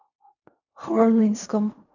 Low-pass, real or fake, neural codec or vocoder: 7.2 kHz; fake; codec, 16 kHz in and 24 kHz out, 0.4 kbps, LongCat-Audio-Codec, fine tuned four codebook decoder